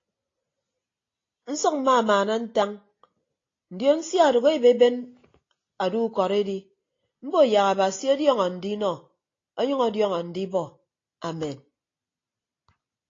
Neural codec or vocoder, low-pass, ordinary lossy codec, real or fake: none; 7.2 kHz; AAC, 32 kbps; real